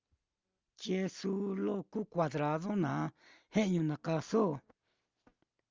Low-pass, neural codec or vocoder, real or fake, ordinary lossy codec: 7.2 kHz; none; real; Opus, 32 kbps